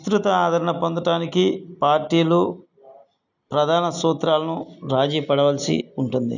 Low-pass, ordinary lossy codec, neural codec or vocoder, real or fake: 7.2 kHz; none; none; real